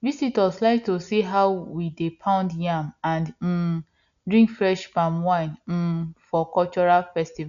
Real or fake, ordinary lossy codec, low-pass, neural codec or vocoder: real; none; 7.2 kHz; none